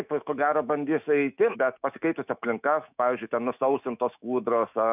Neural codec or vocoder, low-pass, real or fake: autoencoder, 48 kHz, 128 numbers a frame, DAC-VAE, trained on Japanese speech; 3.6 kHz; fake